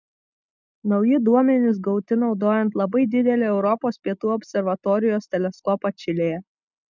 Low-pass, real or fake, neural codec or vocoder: 7.2 kHz; real; none